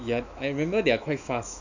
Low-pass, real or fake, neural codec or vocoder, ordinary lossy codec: 7.2 kHz; fake; autoencoder, 48 kHz, 128 numbers a frame, DAC-VAE, trained on Japanese speech; none